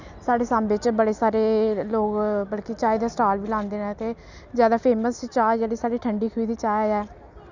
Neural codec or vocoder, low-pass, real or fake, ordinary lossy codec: none; 7.2 kHz; real; none